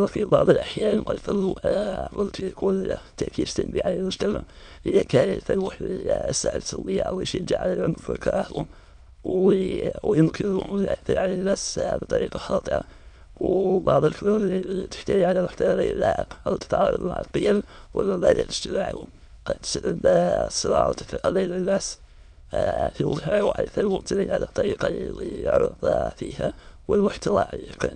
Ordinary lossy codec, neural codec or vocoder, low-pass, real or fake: none; autoencoder, 22.05 kHz, a latent of 192 numbers a frame, VITS, trained on many speakers; 9.9 kHz; fake